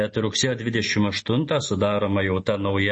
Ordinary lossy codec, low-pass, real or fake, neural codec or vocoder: MP3, 32 kbps; 10.8 kHz; real; none